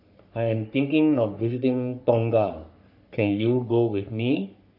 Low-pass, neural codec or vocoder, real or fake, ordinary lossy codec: 5.4 kHz; codec, 44.1 kHz, 3.4 kbps, Pupu-Codec; fake; none